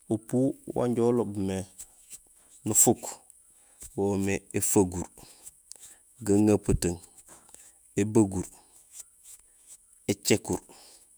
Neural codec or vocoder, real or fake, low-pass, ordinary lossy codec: autoencoder, 48 kHz, 128 numbers a frame, DAC-VAE, trained on Japanese speech; fake; none; none